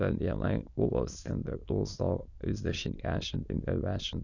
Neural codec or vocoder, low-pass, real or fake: autoencoder, 22.05 kHz, a latent of 192 numbers a frame, VITS, trained on many speakers; 7.2 kHz; fake